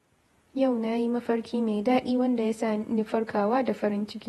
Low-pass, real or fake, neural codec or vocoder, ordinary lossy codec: 19.8 kHz; fake; vocoder, 48 kHz, 128 mel bands, Vocos; AAC, 32 kbps